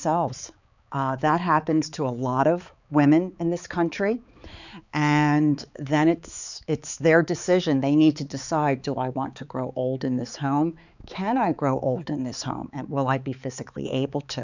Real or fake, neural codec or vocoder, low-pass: fake; codec, 16 kHz, 4 kbps, X-Codec, HuBERT features, trained on balanced general audio; 7.2 kHz